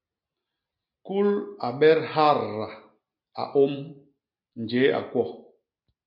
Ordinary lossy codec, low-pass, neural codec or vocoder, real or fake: MP3, 48 kbps; 5.4 kHz; none; real